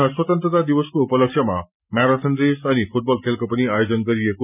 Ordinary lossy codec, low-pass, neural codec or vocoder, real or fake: none; 3.6 kHz; none; real